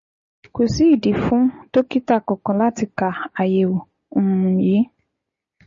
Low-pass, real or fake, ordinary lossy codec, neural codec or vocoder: 7.2 kHz; real; MP3, 32 kbps; none